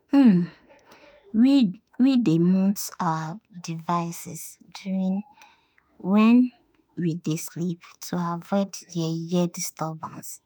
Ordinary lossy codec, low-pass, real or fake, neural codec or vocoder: none; none; fake; autoencoder, 48 kHz, 32 numbers a frame, DAC-VAE, trained on Japanese speech